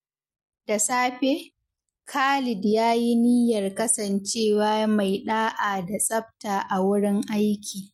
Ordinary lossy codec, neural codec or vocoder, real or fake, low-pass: MP3, 64 kbps; none; real; 19.8 kHz